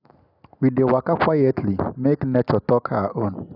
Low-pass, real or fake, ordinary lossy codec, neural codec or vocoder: 5.4 kHz; real; none; none